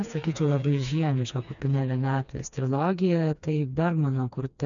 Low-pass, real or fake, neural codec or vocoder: 7.2 kHz; fake; codec, 16 kHz, 2 kbps, FreqCodec, smaller model